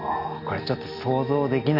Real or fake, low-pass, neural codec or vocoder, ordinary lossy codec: real; 5.4 kHz; none; AAC, 32 kbps